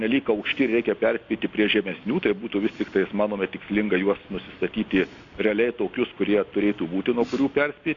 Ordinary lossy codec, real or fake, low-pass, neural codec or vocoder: AAC, 48 kbps; real; 7.2 kHz; none